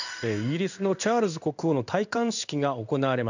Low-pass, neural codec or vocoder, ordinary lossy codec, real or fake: 7.2 kHz; codec, 16 kHz in and 24 kHz out, 1 kbps, XY-Tokenizer; none; fake